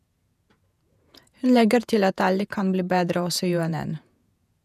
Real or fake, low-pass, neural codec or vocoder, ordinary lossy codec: fake; 14.4 kHz; vocoder, 48 kHz, 128 mel bands, Vocos; none